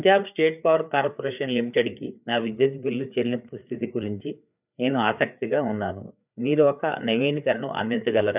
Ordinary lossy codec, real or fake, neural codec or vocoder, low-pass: none; fake; codec, 16 kHz, 4 kbps, FreqCodec, larger model; 3.6 kHz